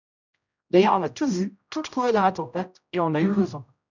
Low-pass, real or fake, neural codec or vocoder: 7.2 kHz; fake; codec, 16 kHz, 0.5 kbps, X-Codec, HuBERT features, trained on general audio